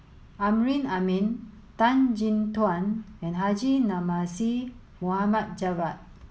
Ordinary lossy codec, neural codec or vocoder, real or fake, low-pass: none; none; real; none